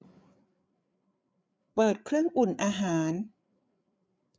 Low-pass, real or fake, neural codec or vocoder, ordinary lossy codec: none; fake; codec, 16 kHz, 8 kbps, FreqCodec, larger model; none